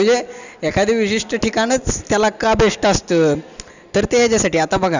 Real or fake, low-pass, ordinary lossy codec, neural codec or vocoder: real; 7.2 kHz; none; none